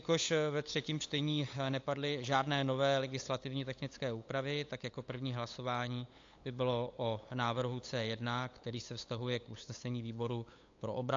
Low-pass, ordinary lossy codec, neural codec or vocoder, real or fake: 7.2 kHz; AAC, 48 kbps; codec, 16 kHz, 8 kbps, FunCodec, trained on LibriTTS, 25 frames a second; fake